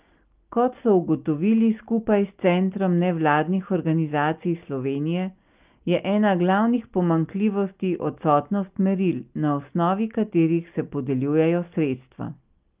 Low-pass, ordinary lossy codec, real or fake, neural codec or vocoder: 3.6 kHz; Opus, 24 kbps; real; none